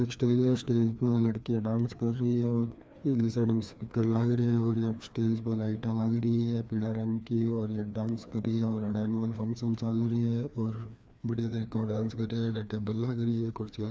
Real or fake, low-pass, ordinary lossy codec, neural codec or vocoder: fake; none; none; codec, 16 kHz, 2 kbps, FreqCodec, larger model